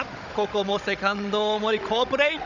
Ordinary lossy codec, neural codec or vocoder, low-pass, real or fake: none; codec, 16 kHz, 16 kbps, FunCodec, trained on Chinese and English, 50 frames a second; 7.2 kHz; fake